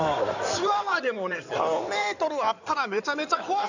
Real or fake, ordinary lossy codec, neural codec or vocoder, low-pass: fake; none; codec, 16 kHz in and 24 kHz out, 2.2 kbps, FireRedTTS-2 codec; 7.2 kHz